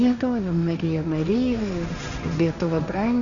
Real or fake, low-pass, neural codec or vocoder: fake; 7.2 kHz; codec, 16 kHz, 1.1 kbps, Voila-Tokenizer